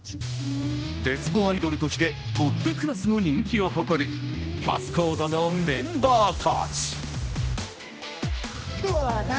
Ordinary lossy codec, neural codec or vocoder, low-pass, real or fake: none; codec, 16 kHz, 1 kbps, X-Codec, HuBERT features, trained on general audio; none; fake